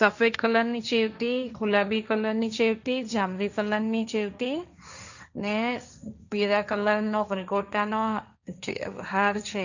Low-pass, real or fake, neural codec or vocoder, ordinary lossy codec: 7.2 kHz; fake; codec, 16 kHz, 1.1 kbps, Voila-Tokenizer; none